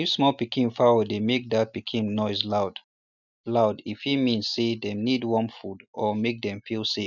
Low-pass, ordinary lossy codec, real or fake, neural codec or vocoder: 7.2 kHz; none; real; none